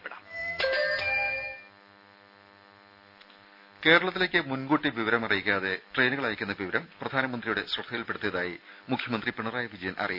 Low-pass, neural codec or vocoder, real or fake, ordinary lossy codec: 5.4 kHz; none; real; AAC, 48 kbps